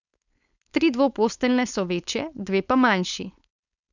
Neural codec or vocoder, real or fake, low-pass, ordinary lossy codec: codec, 16 kHz, 4.8 kbps, FACodec; fake; 7.2 kHz; none